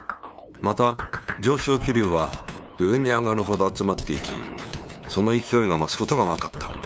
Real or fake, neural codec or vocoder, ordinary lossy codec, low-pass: fake; codec, 16 kHz, 2 kbps, FunCodec, trained on LibriTTS, 25 frames a second; none; none